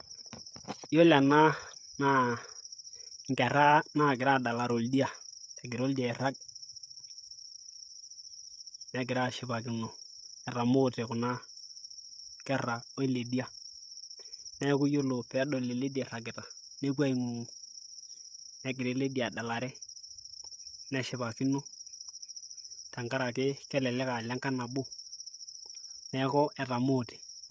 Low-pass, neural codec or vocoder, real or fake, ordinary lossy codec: none; codec, 16 kHz, 16 kbps, FreqCodec, smaller model; fake; none